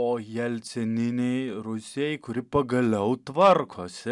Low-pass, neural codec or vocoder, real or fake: 10.8 kHz; none; real